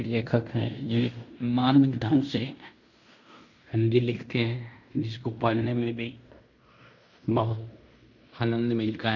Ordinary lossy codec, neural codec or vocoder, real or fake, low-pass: none; codec, 16 kHz in and 24 kHz out, 0.9 kbps, LongCat-Audio-Codec, fine tuned four codebook decoder; fake; 7.2 kHz